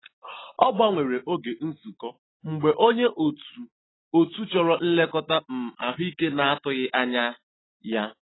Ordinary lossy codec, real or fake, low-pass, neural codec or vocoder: AAC, 16 kbps; real; 7.2 kHz; none